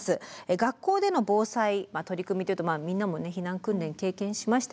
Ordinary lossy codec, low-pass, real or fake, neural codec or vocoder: none; none; real; none